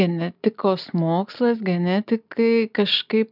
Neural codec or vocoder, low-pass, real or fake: none; 5.4 kHz; real